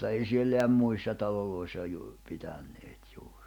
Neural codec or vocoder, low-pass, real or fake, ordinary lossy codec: none; 19.8 kHz; real; none